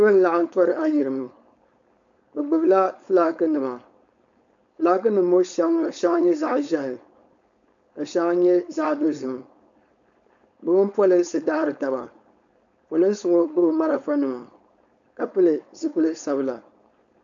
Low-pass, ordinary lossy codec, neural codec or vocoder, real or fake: 7.2 kHz; MP3, 64 kbps; codec, 16 kHz, 4.8 kbps, FACodec; fake